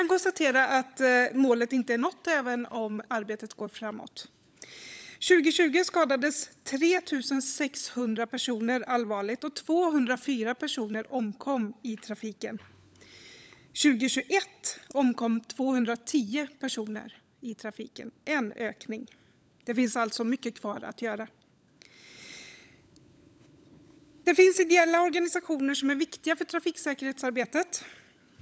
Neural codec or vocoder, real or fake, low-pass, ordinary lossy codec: codec, 16 kHz, 16 kbps, FunCodec, trained on LibriTTS, 50 frames a second; fake; none; none